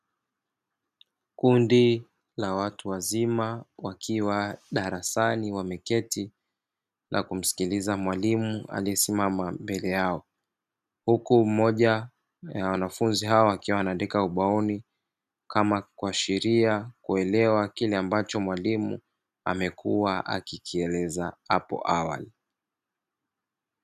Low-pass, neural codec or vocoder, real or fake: 14.4 kHz; none; real